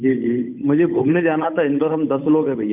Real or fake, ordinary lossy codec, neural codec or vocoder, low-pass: fake; none; codec, 16 kHz, 8 kbps, FunCodec, trained on Chinese and English, 25 frames a second; 3.6 kHz